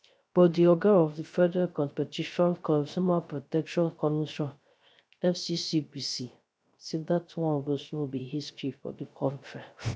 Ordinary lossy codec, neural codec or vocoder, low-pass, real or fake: none; codec, 16 kHz, 0.3 kbps, FocalCodec; none; fake